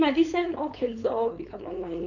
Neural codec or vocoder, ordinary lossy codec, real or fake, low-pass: codec, 16 kHz, 4.8 kbps, FACodec; none; fake; 7.2 kHz